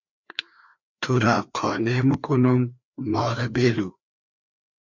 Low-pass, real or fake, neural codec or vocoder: 7.2 kHz; fake; codec, 16 kHz, 2 kbps, FreqCodec, larger model